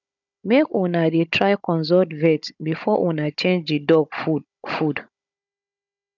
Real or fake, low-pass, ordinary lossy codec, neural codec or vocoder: fake; 7.2 kHz; none; codec, 16 kHz, 16 kbps, FunCodec, trained on Chinese and English, 50 frames a second